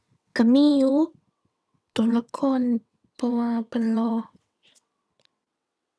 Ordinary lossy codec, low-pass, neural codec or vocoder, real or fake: none; none; vocoder, 22.05 kHz, 80 mel bands, WaveNeXt; fake